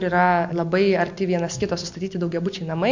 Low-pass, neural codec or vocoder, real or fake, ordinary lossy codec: 7.2 kHz; none; real; MP3, 48 kbps